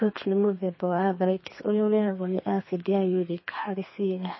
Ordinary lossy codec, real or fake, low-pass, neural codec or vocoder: MP3, 24 kbps; fake; 7.2 kHz; codec, 32 kHz, 1.9 kbps, SNAC